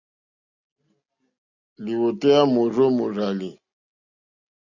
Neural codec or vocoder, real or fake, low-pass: none; real; 7.2 kHz